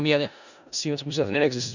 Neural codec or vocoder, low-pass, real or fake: codec, 16 kHz in and 24 kHz out, 0.4 kbps, LongCat-Audio-Codec, four codebook decoder; 7.2 kHz; fake